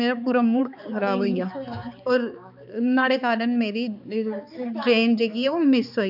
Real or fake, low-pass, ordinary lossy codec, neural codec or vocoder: fake; 5.4 kHz; none; codec, 16 kHz, 4 kbps, X-Codec, HuBERT features, trained on balanced general audio